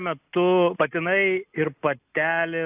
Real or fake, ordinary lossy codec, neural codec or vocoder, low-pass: real; AAC, 32 kbps; none; 3.6 kHz